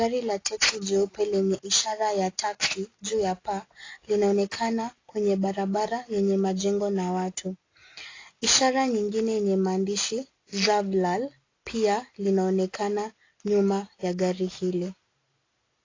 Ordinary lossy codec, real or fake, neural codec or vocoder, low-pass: AAC, 32 kbps; real; none; 7.2 kHz